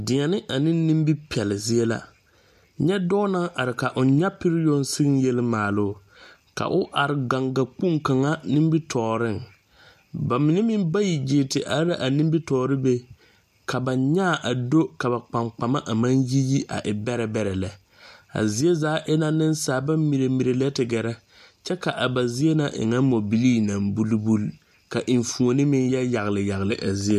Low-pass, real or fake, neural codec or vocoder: 14.4 kHz; real; none